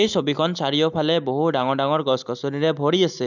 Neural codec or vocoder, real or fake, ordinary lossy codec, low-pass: none; real; none; 7.2 kHz